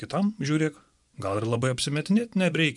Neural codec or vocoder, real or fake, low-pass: vocoder, 24 kHz, 100 mel bands, Vocos; fake; 10.8 kHz